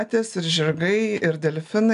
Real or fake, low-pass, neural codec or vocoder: real; 10.8 kHz; none